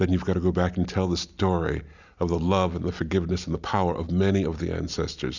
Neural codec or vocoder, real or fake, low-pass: none; real; 7.2 kHz